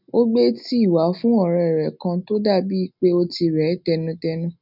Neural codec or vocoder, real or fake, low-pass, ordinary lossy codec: none; real; 5.4 kHz; none